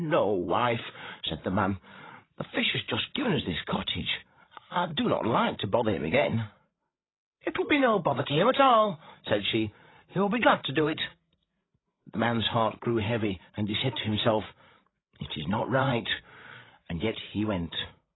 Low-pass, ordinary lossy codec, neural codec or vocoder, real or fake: 7.2 kHz; AAC, 16 kbps; codec, 16 kHz, 16 kbps, FreqCodec, larger model; fake